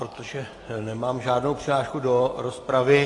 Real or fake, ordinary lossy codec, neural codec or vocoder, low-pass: real; AAC, 32 kbps; none; 10.8 kHz